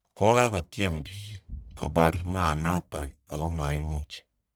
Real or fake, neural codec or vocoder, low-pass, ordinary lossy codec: fake; codec, 44.1 kHz, 1.7 kbps, Pupu-Codec; none; none